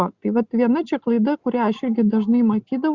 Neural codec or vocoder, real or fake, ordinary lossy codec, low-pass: none; real; Opus, 64 kbps; 7.2 kHz